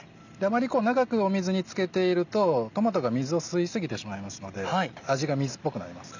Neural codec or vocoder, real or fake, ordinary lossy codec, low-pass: none; real; none; 7.2 kHz